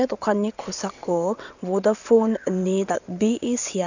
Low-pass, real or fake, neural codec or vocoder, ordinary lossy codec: 7.2 kHz; fake; vocoder, 22.05 kHz, 80 mel bands, WaveNeXt; none